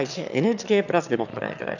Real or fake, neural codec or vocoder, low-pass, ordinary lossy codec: fake; autoencoder, 22.05 kHz, a latent of 192 numbers a frame, VITS, trained on one speaker; 7.2 kHz; none